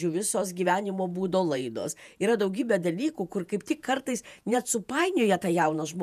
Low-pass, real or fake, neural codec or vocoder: 14.4 kHz; fake; vocoder, 48 kHz, 128 mel bands, Vocos